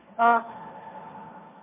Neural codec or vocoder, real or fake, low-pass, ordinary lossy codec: codec, 16 kHz, 1.1 kbps, Voila-Tokenizer; fake; 3.6 kHz; MP3, 16 kbps